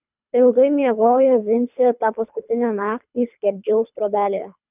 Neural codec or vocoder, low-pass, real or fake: codec, 24 kHz, 3 kbps, HILCodec; 3.6 kHz; fake